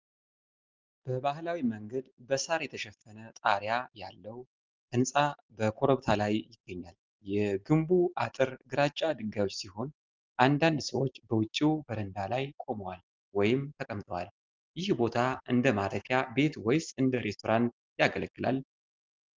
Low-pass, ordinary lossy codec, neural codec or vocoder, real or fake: 7.2 kHz; Opus, 24 kbps; none; real